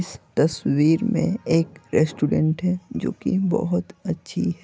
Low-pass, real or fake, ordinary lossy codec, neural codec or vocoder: none; real; none; none